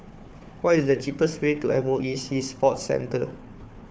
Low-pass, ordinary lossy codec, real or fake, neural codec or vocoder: none; none; fake; codec, 16 kHz, 4 kbps, FunCodec, trained on Chinese and English, 50 frames a second